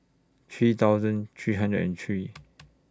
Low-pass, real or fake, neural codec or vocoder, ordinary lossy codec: none; real; none; none